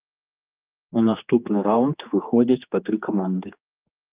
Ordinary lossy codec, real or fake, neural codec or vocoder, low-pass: Opus, 32 kbps; fake; codec, 16 kHz, 2 kbps, X-Codec, HuBERT features, trained on general audio; 3.6 kHz